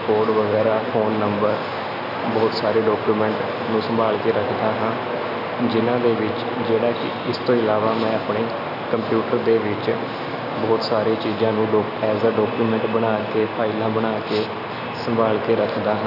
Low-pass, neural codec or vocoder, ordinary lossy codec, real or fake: 5.4 kHz; none; none; real